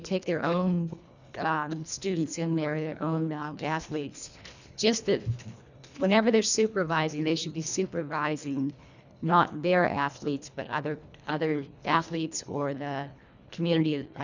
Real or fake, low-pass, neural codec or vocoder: fake; 7.2 kHz; codec, 24 kHz, 1.5 kbps, HILCodec